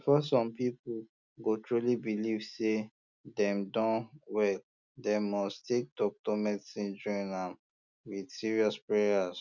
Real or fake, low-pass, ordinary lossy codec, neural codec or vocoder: real; 7.2 kHz; none; none